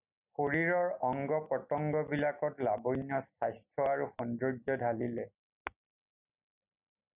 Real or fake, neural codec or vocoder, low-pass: real; none; 3.6 kHz